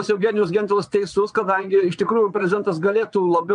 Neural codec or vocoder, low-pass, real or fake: vocoder, 22.05 kHz, 80 mel bands, Vocos; 9.9 kHz; fake